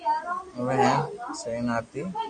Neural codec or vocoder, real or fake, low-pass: none; real; 9.9 kHz